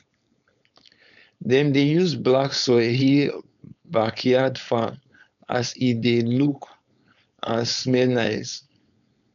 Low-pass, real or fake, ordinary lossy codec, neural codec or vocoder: 7.2 kHz; fake; none; codec, 16 kHz, 4.8 kbps, FACodec